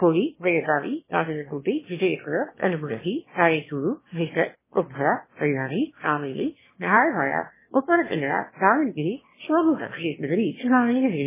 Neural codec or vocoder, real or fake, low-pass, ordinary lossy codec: autoencoder, 22.05 kHz, a latent of 192 numbers a frame, VITS, trained on one speaker; fake; 3.6 kHz; MP3, 16 kbps